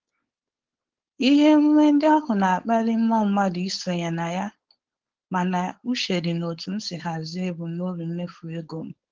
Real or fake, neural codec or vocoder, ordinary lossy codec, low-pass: fake; codec, 16 kHz, 4.8 kbps, FACodec; Opus, 16 kbps; 7.2 kHz